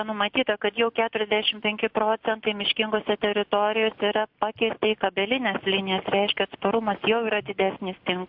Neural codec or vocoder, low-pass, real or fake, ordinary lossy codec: none; 5.4 kHz; real; MP3, 48 kbps